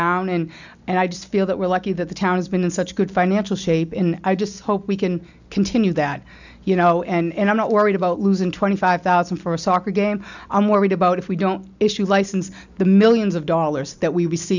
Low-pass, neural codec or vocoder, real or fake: 7.2 kHz; none; real